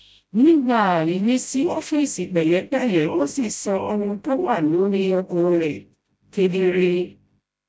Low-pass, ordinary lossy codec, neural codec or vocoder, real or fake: none; none; codec, 16 kHz, 0.5 kbps, FreqCodec, smaller model; fake